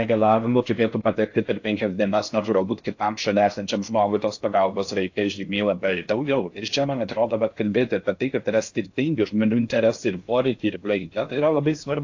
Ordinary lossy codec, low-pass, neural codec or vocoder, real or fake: AAC, 48 kbps; 7.2 kHz; codec, 16 kHz in and 24 kHz out, 0.6 kbps, FocalCodec, streaming, 4096 codes; fake